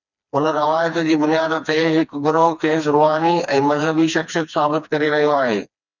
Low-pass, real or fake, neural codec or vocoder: 7.2 kHz; fake; codec, 16 kHz, 2 kbps, FreqCodec, smaller model